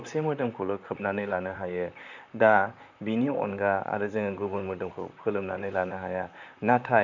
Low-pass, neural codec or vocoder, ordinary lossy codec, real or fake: 7.2 kHz; vocoder, 44.1 kHz, 128 mel bands, Pupu-Vocoder; none; fake